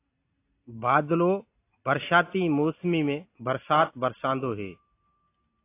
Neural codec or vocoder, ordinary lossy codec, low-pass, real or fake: none; AAC, 24 kbps; 3.6 kHz; real